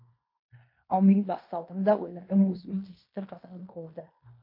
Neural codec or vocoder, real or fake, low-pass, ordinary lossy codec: codec, 16 kHz in and 24 kHz out, 0.9 kbps, LongCat-Audio-Codec, fine tuned four codebook decoder; fake; 5.4 kHz; MP3, 32 kbps